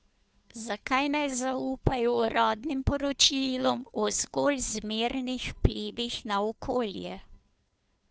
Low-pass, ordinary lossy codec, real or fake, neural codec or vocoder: none; none; fake; codec, 16 kHz, 2 kbps, FunCodec, trained on Chinese and English, 25 frames a second